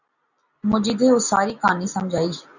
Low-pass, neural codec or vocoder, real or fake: 7.2 kHz; none; real